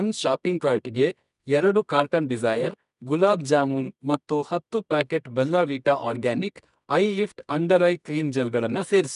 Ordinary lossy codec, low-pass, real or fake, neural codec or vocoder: none; 10.8 kHz; fake; codec, 24 kHz, 0.9 kbps, WavTokenizer, medium music audio release